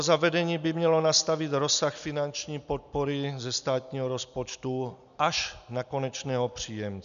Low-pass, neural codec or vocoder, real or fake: 7.2 kHz; none; real